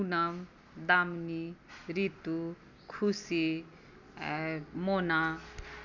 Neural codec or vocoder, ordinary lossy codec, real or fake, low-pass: none; none; real; 7.2 kHz